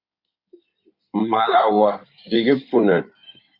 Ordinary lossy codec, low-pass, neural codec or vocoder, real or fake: Opus, 64 kbps; 5.4 kHz; codec, 16 kHz in and 24 kHz out, 2.2 kbps, FireRedTTS-2 codec; fake